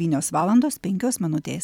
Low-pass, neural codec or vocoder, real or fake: 19.8 kHz; vocoder, 44.1 kHz, 128 mel bands every 256 samples, BigVGAN v2; fake